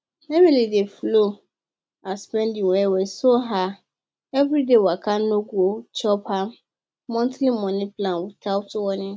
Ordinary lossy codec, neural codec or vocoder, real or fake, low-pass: none; none; real; none